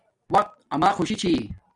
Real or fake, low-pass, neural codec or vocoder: real; 10.8 kHz; none